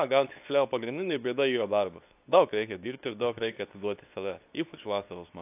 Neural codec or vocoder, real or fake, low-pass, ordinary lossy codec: codec, 24 kHz, 0.9 kbps, WavTokenizer, medium speech release version 2; fake; 3.6 kHz; AAC, 32 kbps